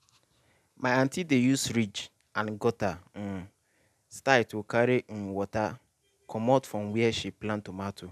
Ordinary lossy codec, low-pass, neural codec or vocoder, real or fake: none; 14.4 kHz; none; real